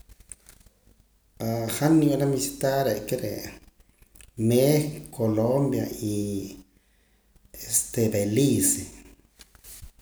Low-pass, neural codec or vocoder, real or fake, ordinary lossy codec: none; none; real; none